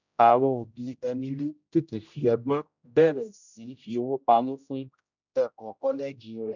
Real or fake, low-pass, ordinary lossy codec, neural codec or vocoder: fake; 7.2 kHz; none; codec, 16 kHz, 0.5 kbps, X-Codec, HuBERT features, trained on general audio